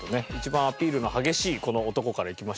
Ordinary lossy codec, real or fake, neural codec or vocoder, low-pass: none; real; none; none